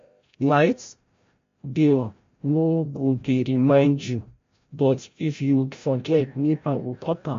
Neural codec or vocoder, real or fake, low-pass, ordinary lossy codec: codec, 16 kHz, 0.5 kbps, FreqCodec, larger model; fake; 7.2 kHz; MP3, 48 kbps